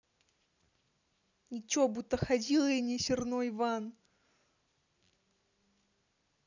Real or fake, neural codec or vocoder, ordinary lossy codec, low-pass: real; none; none; 7.2 kHz